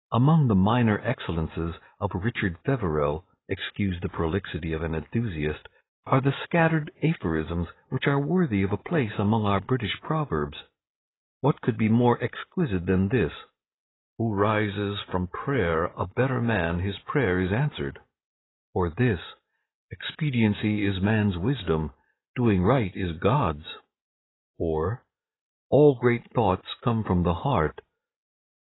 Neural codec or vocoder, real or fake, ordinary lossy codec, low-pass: none; real; AAC, 16 kbps; 7.2 kHz